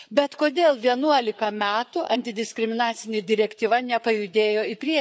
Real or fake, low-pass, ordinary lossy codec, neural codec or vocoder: fake; none; none; codec, 16 kHz, 4 kbps, FreqCodec, larger model